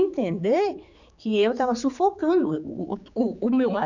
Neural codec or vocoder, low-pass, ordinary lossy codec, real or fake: codec, 16 kHz, 4 kbps, X-Codec, HuBERT features, trained on general audio; 7.2 kHz; none; fake